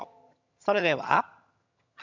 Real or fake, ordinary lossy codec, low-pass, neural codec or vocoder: fake; none; 7.2 kHz; vocoder, 22.05 kHz, 80 mel bands, HiFi-GAN